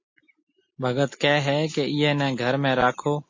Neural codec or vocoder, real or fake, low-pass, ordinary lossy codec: none; real; 7.2 kHz; MP3, 32 kbps